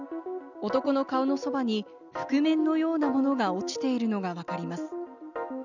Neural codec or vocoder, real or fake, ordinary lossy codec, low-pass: none; real; none; 7.2 kHz